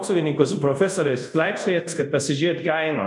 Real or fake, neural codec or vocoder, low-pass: fake; codec, 24 kHz, 0.5 kbps, DualCodec; 10.8 kHz